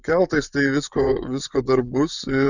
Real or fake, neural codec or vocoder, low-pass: fake; vocoder, 24 kHz, 100 mel bands, Vocos; 7.2 kHz